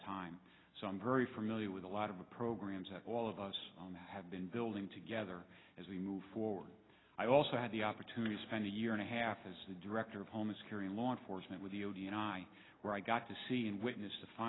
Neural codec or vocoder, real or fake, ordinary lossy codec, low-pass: none; real; AAC, 16 kbps; 7.2 kHz